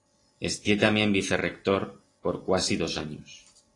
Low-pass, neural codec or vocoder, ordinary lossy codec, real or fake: 10.8 kHz; none; AAC, 32 kbps; real